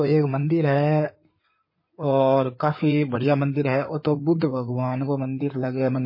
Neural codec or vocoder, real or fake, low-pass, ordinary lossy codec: codec, 16 kHz in and 24 kHz out, 2.2 kbps, FireRedTTS-2 codec; fake; 5.4 kHz; MP3, 24 kbps